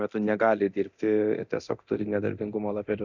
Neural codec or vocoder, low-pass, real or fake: codec, 24 kHz, 0.9 kbps, DualCodec; 7.2 kHz; fake